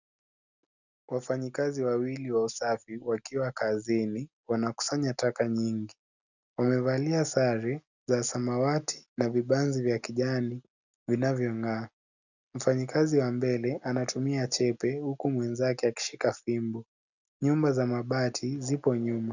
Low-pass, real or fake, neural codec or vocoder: 7.2 kHz; real; none